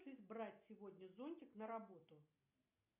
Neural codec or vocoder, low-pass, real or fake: none; 3.6 kHz; real